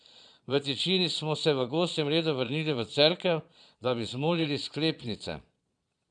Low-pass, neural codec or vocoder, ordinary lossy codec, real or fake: 9.9 kHz; vocoder, 22.05 kHz, 80 mel bands, Vocos; MP3, 96 kbps; fake